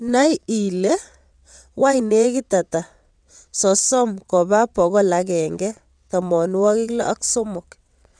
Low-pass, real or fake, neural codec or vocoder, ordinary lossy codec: 9.9 kHz; fake; vocoder, 22.05 kHz, 80 mel bands, WaveNeXt; none